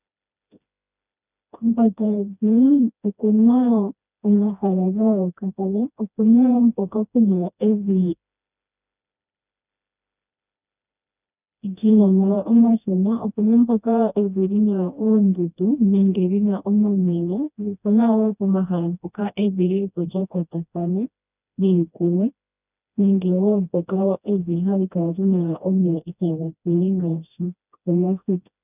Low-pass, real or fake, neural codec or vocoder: 3.6 kHz; fake; codec, 16 kHz, 1 kbps, FreqCodec, smaller model